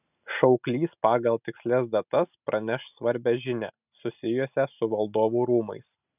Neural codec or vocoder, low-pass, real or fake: none; 3.6 kHz; real